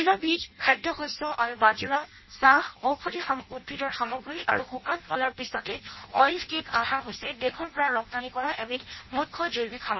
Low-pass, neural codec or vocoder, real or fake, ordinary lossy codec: 7.2 kHz; codec, 16 kHz in and 24 kHz out, 0.6 kbps, FireRedTTS-2 codec; fake; MP3, 24 kbps